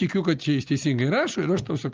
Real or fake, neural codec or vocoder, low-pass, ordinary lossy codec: real; none; 7.2 kHz; Opus, 24 kbps